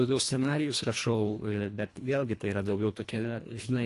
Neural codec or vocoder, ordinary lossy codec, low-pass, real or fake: codec, 24 kHz, 1.5 kbps, HILCodec; AAC, 48 kbps; 10.8 kHz; fake